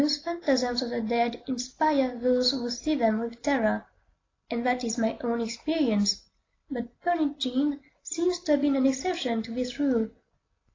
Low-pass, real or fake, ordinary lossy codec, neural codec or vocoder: 7.2 kHz; real; AAC, 32 kbps; none